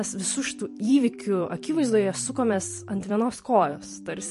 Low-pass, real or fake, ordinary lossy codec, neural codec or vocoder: 14.4 kHz; fake; MP3, 48 kbps; vocoder, 44.1 kHz, 128 mel bands every 256 samples, BigVGAN v2